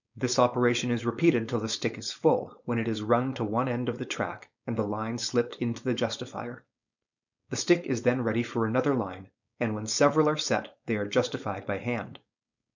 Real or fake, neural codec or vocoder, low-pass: fake; codec, 16 kHz, 4.8 kbps, FACodec; 7.2 kHz